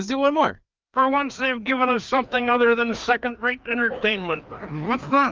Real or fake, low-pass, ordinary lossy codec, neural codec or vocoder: fake; 7.2 kHz; Opus, 32 kbps; codec, 16 kHz, 2 kbps, FreqCodec, larger model